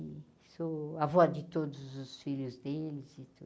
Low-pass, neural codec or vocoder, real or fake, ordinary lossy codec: none; none; real; none